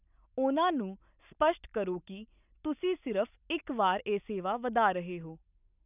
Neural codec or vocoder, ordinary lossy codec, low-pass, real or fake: none; none; 3.6 kHz; real